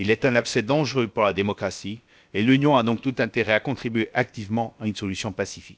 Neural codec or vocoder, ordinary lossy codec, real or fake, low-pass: codec, 16 kHz, about 1 kbps, DyCAST, with the encoder's durations; none; fake; none